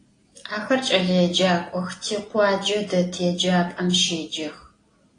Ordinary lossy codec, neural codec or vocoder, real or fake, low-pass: AAC, 32 kbps; none; real; 9.9 kHz